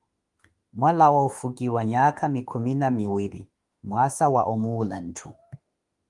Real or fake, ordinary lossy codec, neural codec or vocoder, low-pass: fake; Opus, 24 kbps; autoencoder, 48 kHz, 32 numbers a frame, DAC-VAE, trained on Japanese speech; 10.8 kHz